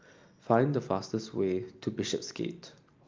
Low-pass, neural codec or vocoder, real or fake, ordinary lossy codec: 7.2 kHz; none; real; Opus, 32 kbps